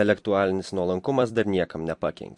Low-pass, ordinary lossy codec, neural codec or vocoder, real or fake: 10.8 kHz; MP3, 48 kbps; vocoder, 44.1 kHz, 128 mel bands every 256 samples, BigVGAN v2; fake